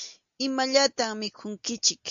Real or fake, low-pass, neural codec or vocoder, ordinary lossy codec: real; 7.2 kHz; none; AAC, 64 kbps